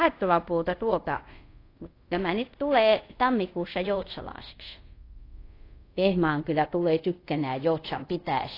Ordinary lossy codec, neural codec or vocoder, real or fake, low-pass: AAC, 32 kbps; codec, 24 kHz, 0.5 kbps, DualCodec; fake; 5.4 kHz